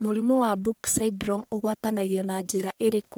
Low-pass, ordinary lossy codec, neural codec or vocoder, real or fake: none; none; codec, 44.1 kHz, 1.7 kbps, Pupu-Codec; fake